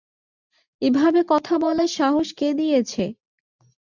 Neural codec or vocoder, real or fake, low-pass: none; real; 7.2 kHz